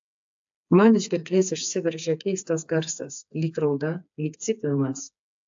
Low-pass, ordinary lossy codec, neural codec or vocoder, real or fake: 7.2 kHz; AAC, 64 kbps; codec, 16 kHz, 4 kbps, FreqCodec, smaller model; fake